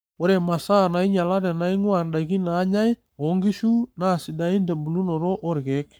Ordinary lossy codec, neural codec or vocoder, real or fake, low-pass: none; codec, 44.1 kHz, 7.8 kbps, Pupu-Codec; fake; none